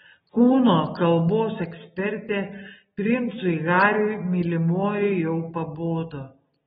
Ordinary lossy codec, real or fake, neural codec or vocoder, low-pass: AAC, 16 kbps; real; none; 19.8 kHz